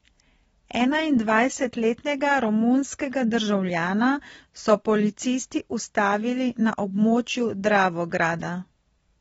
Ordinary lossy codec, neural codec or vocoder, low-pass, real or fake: AAC, 24 kbps; none; 19.8 kHz; real